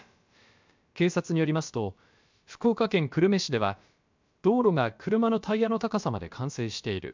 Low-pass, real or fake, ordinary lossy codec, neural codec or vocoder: 7.2 kHz; fake; MP3, 64 kbps; codec, 16 kHz, about 1 kbps, DyCAST, with the encoder's durations